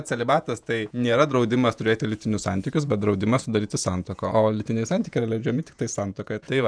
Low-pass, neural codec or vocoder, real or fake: 9.9 kHz; vocoder, 48 kHz, 128 mel bands, Vocos; fake